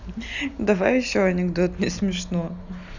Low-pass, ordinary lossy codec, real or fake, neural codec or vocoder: 7.2 kHz; none; real; none